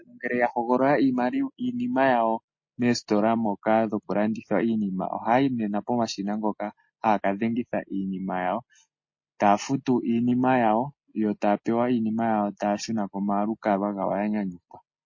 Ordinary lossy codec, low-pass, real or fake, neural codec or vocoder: MP3, 32 kbps; 7.2 kHz; real; none